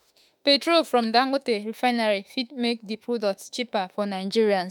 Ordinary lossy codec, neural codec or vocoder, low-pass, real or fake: none; autoencoder, 48 kHz, 32 numbers a frame, DAC-VAE, trained on Japanese speech; none; fake